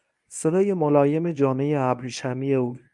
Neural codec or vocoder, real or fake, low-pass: codec, 24 kHz, 0.9 kbps, WavTokenizer, medium speech release version 1; fake; 10.8 kHz